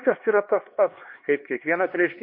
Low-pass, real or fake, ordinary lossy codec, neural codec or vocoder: 5.4 kHz; fake; MP3, 32 kbps; codec, 16 kHz, 2 kbps, X-Codec, HuBERT features, trained on LibriSpeech